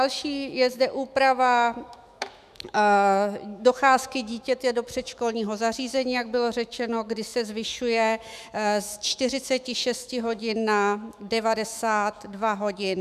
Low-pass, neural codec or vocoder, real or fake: 14.4 kHz; autoencoder, 48 kHz, 128 numbers a frame, DAC-VAE, trained on Japanese speech; fake